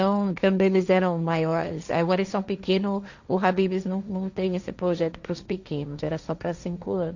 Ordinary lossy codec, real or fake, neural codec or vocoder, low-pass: none; fake; codec, 16 kHz, 1.1 kbps, Voila-Tokenizer; 7.2 kHz